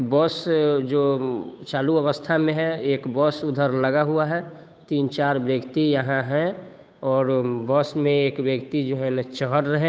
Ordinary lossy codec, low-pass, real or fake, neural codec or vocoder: none; none; fake; codec, 16 kHz, 8 kbps, FunCodec, trained on Chinese and English, 25 frames a second